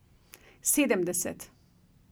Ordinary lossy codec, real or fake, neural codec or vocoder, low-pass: none; real; none; none